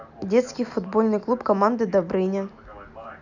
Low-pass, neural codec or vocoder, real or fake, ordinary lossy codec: 7.2 kHz; none; real; none